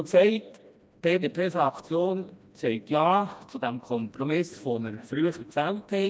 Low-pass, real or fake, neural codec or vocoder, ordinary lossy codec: none; fake; codec, 16 kHz, 1 kbps, FreqCodec, smaller model; none